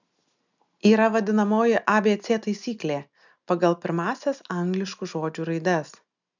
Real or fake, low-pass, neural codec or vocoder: real; 7.2 kHz; none